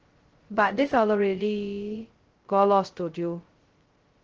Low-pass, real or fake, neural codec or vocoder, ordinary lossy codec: 7.2 kHz; fake; codec, 16 kHz, 0.2 kbps, FocalCodec; Opus, 16 kbps